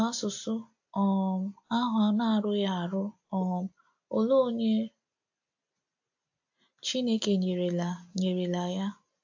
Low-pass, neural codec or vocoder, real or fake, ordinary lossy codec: 7.2 kHz; none; real; AAC, 48 kbps